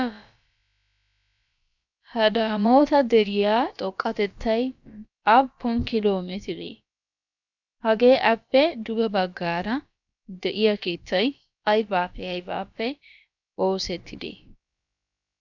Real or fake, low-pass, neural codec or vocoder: fake; 7.2 kHz; codec, 16 kHz, about 1 kbps, DyCAST, with the encoder's durations